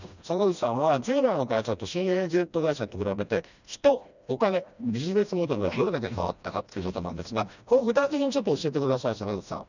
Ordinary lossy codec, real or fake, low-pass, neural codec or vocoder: none; fake; 7.2 kHz; codec, 16 kHz, 1 kbps, FreqCodec, smaller model